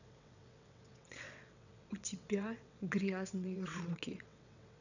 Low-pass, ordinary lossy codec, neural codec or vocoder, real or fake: 7.2 kHz; none; vocoder, 22.05 kHz, 80 mel bands, WaveNeXt; fake